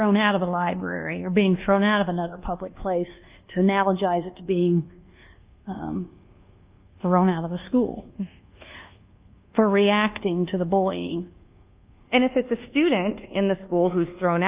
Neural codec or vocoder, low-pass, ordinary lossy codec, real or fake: codec, 24 kHz, 1.2 kbps, DualCodec; 3.6 kHz; Opus, 24 kbps; fake